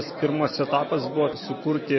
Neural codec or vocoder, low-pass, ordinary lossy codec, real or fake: none; 7.2 kHz; MP3, 24 kbps; real